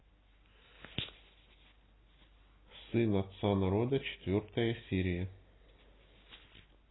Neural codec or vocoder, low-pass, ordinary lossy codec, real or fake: none; 7.2 kHz; AAC, 16 kbps; real